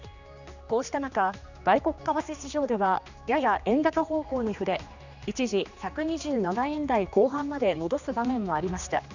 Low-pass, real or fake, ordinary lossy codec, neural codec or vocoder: 7.2 kHz; fake; none; codec, 16 kHz, 2 kbps, X-Codec, HuBERT features, trained on general audio